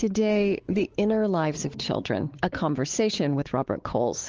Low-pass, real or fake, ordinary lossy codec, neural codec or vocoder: 7.2 kHz; fake; Opus, 16 kbps; codec, 16 kHz, 4 kbps, X-Codec, WavLM features, trained on Multilingual LibriSpeech